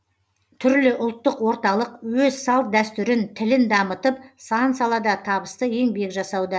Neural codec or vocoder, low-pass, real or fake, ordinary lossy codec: none; none; real; none